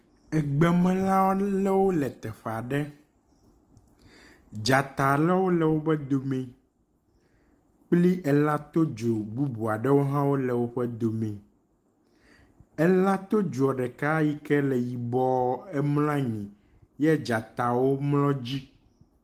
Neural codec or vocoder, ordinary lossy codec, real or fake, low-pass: none; Opus, 24 kbps; real; 14.4 kHz